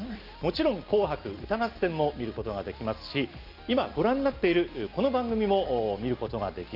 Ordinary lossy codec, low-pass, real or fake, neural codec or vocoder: Opus, 32 kbps; 5.4 kHz; real; none